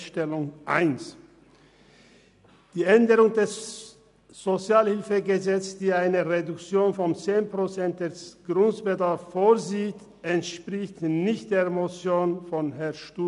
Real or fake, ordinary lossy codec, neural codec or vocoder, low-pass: real; none; none; 10.8 kHz